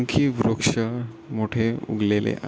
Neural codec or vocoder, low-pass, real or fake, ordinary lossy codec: none; none; real; none